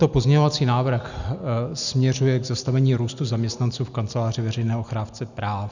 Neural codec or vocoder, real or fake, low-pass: none; real; 7.2 kHz